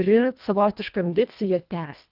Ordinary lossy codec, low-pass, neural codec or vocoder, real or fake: Opus, 24 kbps; 5.4 kHz; codec, 24 kHz, 1.5 kbps, HILCodec; fake